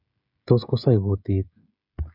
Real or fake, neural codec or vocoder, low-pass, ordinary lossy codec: fake; codec, 16 kHz, 16 kbps, FreqCodec, smaller model; 5.4 kHz; none